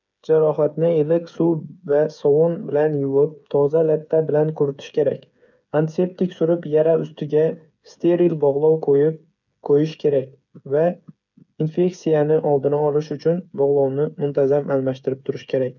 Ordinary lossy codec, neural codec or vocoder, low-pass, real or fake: none; codec, 16 kHz, 8 kbps, FreqCodec, smaller model; 7.2 kHz; fake